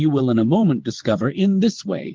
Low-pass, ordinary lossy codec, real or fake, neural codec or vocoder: 7.2 kHz; Opus, 16 kbps; fake; vocoder, 22.05 kHz, 80 mel bands, WaveNeXt